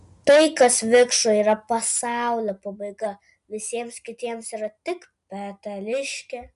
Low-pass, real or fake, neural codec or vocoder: 10.8 kHz; real; none